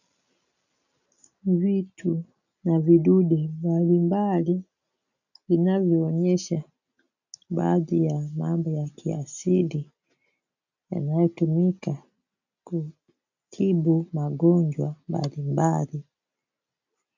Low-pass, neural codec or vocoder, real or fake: 7.2 kHz; none; real